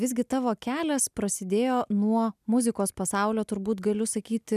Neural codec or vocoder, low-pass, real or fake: none; 14.4 kHz; real